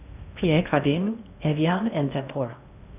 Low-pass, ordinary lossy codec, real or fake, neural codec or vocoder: 3.6 kHz; none; fake; codec, 16 kHz in and 24 kHz out, 0.8 kbps, FocalCodec, streaming, 65536 codes